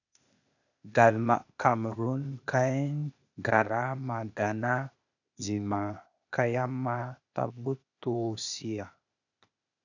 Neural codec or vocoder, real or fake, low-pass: codec, 16 kHz, 0.8 kbps, ZipCodec; fake; 7.2 kHz